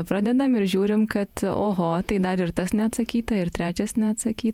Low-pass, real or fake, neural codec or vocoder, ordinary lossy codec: 19.8 kHz; fake; vocoder, 48 kHz, 128 mel bands, Vocos; MP3, 96 kbps